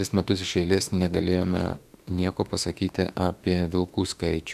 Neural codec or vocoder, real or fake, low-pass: autoencoder, 48 kHz, 32 numbers a frame, DAC-VAE, trained on Japanese speech; fake; 14.4 kHz